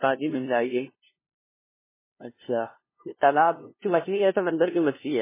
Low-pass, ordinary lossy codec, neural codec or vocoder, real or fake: 3.6 kHz; MP3, 16 kbps; codec, 16 kHz, 0.5 kbps, FunCodec, trained on LibriTTS, 25 frames a second; fake